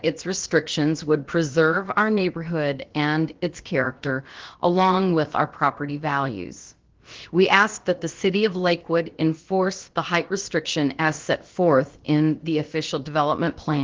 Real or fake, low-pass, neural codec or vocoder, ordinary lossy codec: fake; 7.2 kHz; codec, 16 kHz, about 1 kbps, DyCAST, with the encoder's durations; Opus, 16 kbps